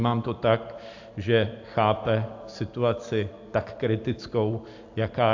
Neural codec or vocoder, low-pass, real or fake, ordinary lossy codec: none; 7.2 kHz; real; AAC, 48 kbps